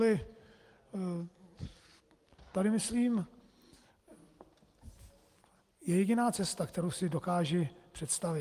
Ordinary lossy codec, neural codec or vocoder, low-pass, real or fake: Opus, 32 kbps; none; 14.4 kHz; real